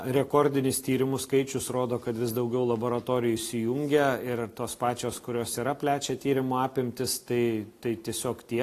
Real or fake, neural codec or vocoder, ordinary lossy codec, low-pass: real; none; AAC, 48 kbps; 14.4 kHz